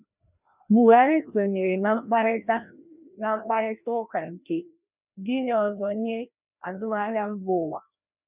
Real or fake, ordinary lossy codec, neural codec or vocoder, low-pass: fake; none; codec, 16 kHz, 1 kbps, FreqCodec, larger model; 3.6 kHz